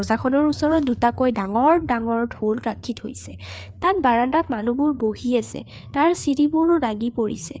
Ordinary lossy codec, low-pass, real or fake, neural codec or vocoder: none; none; fake; codec, 16 kHz, 4 kbps, FreqCodec, larger model